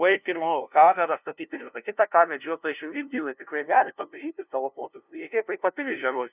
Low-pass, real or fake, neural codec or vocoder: 3.6 kHz; fake; codec, 16 kHz, 0.5 kbps, FunCodec, trained on LibriTTS, 25 frames a second